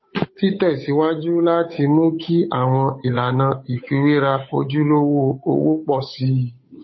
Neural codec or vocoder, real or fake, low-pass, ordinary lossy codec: codec, 16 kHz, 8 kbps, FunCodec, trained on Chinese and English, 25 frames a second; fake; 7.2 kHz; MP3, 24 kbps